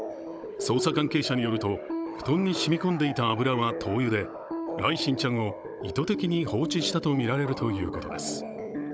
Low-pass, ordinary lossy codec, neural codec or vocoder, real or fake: none; none; codec, 16 kHz, 16 kbps, FunCodec, trained on Chinese and English, 50 frames a second; fake